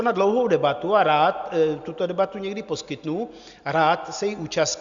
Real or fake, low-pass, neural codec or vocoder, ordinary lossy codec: real; 7.2 kHz; none; Opus, 64 kbps